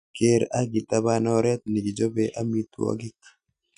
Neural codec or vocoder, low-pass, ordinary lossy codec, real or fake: none; 9.9 kHz; none; real